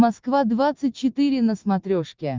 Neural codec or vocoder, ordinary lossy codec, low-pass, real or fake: none; Opus, 24 kbps; 7.2 kHz; real